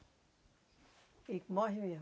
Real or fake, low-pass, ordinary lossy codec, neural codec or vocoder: real; none; none; none